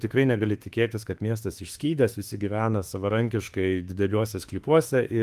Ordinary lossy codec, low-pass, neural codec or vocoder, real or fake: Opus, 24 kbps; 14.4 kHz; autoencoder, 48 kHz, 32 numbers a frame, DAC-VAE, trained on Japanese speech; fake